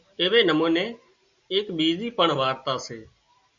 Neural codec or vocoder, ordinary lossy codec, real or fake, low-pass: none; Opus, 64 kbps; real; 7.2 kHz